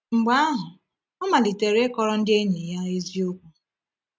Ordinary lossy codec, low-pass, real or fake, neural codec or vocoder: none; none; real; none